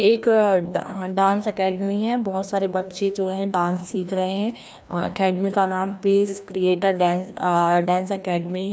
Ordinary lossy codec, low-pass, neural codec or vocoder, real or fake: none; none; codec, 16 kHz, 1 kbps, FreqCodec, larger model; fake